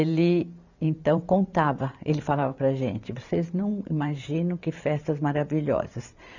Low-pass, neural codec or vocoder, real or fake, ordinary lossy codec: 7.2 kHz; vocoder, 44.1 kHz, 128 mel bands every 512 samples, BigVGAN v2; fake; none